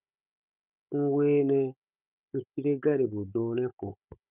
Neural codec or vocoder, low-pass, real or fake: codec, 16 kHz, 16 kbps, FunCodec, trained on Chinese and English, 50 frames a second; 3.6 kHz; fake